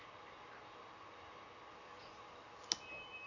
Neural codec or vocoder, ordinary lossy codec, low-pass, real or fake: none; none; 7.2 kHz; real